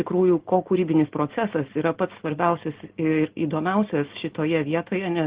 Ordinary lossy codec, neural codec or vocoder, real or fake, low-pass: Opus, 16 kbps; none; real; 3.6 kHz